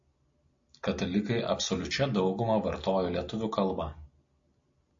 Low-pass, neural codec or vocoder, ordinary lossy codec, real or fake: 7.2 kHz; none; AAC, 64 kbps; real